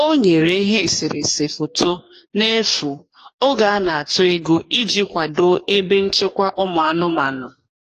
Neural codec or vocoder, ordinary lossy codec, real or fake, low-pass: codec, 44.1 kHz, 2.6 kbps, DAC; AAC, 48 kbps; fake; 14.4 kHz